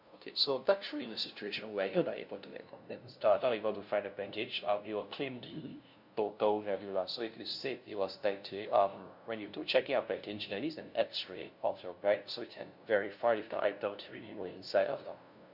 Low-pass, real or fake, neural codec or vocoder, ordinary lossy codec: 5.4 kHz; fake; codec, 16 kHz, 0.5 kbps, FunCodec, trained on LibriTTS, 25 frames a second; none